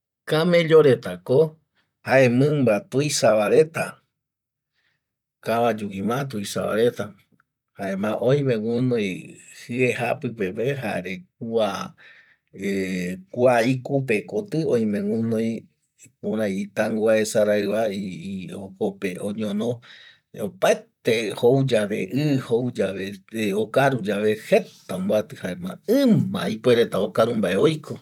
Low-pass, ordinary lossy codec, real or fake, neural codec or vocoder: 19.8 kHz; none; fake; vocoder, 44.1 kHz, 128 mel bands, Pupu-Vocoder